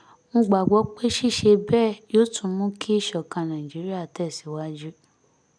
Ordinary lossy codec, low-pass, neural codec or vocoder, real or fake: none; 9.9 kHz; none; real